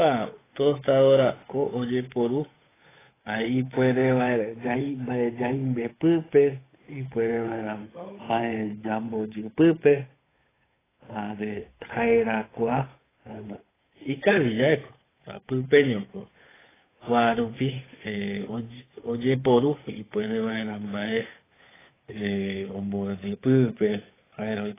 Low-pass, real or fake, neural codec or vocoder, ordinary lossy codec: 3.6 kHz; fake; codec, 16 kHz, 8 kbps, FunCodec, trained on Chinese and English, 25 frames a second; AAC, 16 kbps